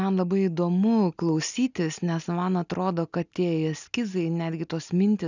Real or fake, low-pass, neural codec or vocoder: real; 7.2 kHz; none